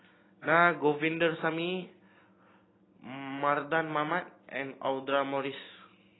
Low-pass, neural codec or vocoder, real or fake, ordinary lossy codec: 7.2 kHz; none; real; AAC, 16 kbps